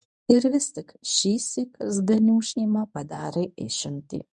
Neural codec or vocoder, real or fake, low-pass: codec, 24 kHz, 0.9 kbps, WavTokenizer, medium speech release version 1; fake; 10.8 kHz